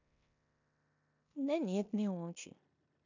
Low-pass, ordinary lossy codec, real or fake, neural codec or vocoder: 7.2 kHz; none; fake; codec, 16 kHz in and 24 kHz out, 0.9 kbps, LongCat-Audio-Codec, four codebook decoder